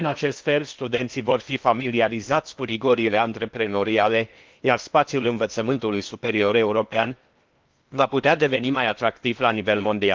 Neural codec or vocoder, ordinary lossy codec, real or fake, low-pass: codec, 16 kHz in and 24 kHz out, 0.8 kbps, FocalCodec, streaming, 65536 codes; Opus, 32 kbps; fake; 7.2 kHz